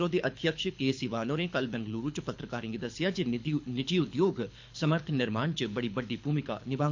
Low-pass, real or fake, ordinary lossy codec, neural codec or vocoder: 7.2 kHz; fake; MP3, 48 kbps; codec, 24 kHz, 6 kbps, HILCodec